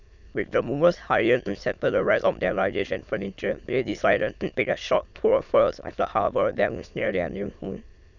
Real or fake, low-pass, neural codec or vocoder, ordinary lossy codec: fake; 7.2 kHz; autoencoder, 22.05 kHz, a latent of 192 numbers a frame, VITS, trained on many speakers; none